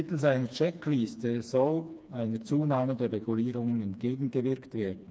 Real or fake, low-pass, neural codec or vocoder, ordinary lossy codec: fake; none; codec, 16 kHz, 2 kbps, FreqCodec, smaller model; none